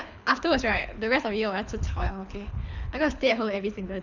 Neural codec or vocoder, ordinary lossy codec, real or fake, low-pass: codec, 24 kHz, 6 kbps, HILCodec; none; fake; 7.2 kHz